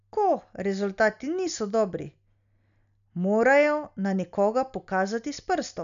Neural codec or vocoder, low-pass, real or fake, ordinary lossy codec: none; 7.2 kHz; real; none